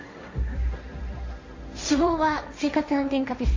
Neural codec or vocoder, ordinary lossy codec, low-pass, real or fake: codec, 16 kHz, 1.1 kbps, Voila-Tokenizer; MP3, 32 kbps; 7.2 kHz; fake